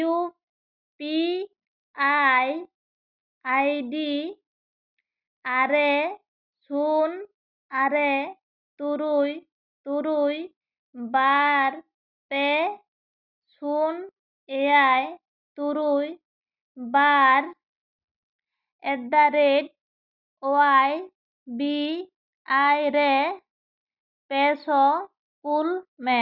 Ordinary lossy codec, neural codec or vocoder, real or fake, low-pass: Opus, 64 kbps; none; real; 5.4 kHz